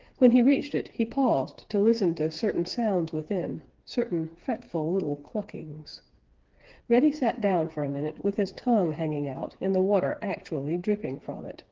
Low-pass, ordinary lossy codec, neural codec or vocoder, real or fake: 7.2 kHz; Opus, 16 kbps; codec, 16 kHz, 4 kbps, FreqCodec, smaller model; fake